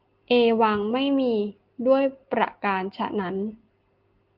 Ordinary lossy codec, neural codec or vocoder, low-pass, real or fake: Opus, 24 kbps; none; 5.4 kHz; real